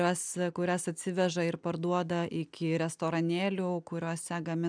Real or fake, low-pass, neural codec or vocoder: real; 9.9 kHz; none